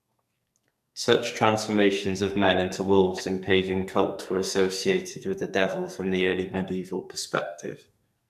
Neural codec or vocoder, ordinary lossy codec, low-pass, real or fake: codec, 44.1 kHz, 2.6 kbps, SNAC; none; 14.4 kHz; fake